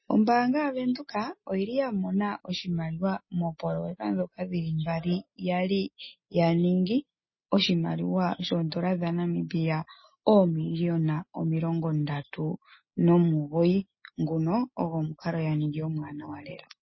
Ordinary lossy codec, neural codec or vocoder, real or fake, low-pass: MP3, 24 kbps; none; real; 7.2 kHz